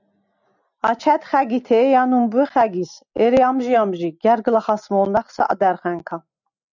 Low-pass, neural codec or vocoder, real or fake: 7.2 kHz; none; real